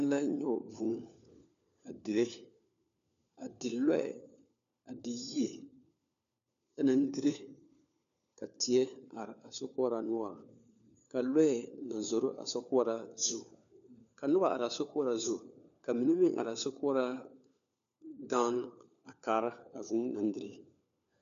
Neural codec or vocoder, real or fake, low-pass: codec, 16 kHz, 4 kbps, FunCodec, trained on LibriTTS, 50 frames a second; fake; 7.2 kHz